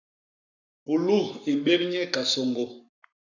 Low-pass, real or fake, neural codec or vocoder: 7.2 kHz; fake; codec, 44.1 kHz, 7.8 kbps, Pupu-Codec